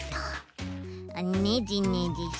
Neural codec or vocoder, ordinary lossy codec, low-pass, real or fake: none; none; none; real